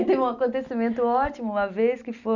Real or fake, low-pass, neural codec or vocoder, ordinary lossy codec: real; 7.2 kHz; none; none